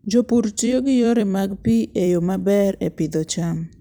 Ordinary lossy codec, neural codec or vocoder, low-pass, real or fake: none; vocoder, 44.1 kHz, 128 mel bands every 256 samples, BigVGAN v2; none; fake